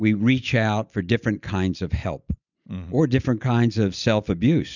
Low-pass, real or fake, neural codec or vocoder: 7.2 kHz; real; none